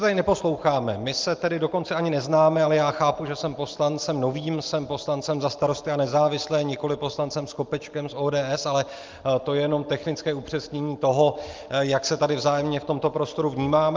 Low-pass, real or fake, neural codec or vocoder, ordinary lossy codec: 7.2 kHz; real; none; Opus, 24 kbps